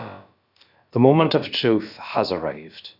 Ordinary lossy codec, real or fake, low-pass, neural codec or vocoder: none; fake; 5.4 kHz; codec, 16 kHz, about 1 kbps, DyCAST, with the encoder's durations